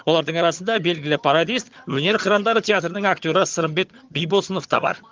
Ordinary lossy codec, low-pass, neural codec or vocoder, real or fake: Opus, 32 kbps; 7.2 kHz; vocoder, 22.05 kHz, 80 mel bands, HiFi-GAN; fake